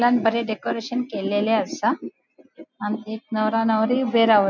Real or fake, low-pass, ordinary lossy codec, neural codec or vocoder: real; 7.2 kHz; none; none